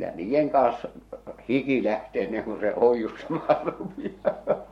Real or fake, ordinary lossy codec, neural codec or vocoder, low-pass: fake; MP3, 64 kbps; codec, 44.1 kHz, 7.8 kbps, Pupu-Codec; 19.8 kHz